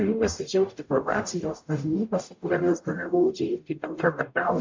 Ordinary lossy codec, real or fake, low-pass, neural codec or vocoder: MP3, 48 kbps; fake; 7.2 kHz; codec, 44.1 kHz, 0.9 kbps, DAC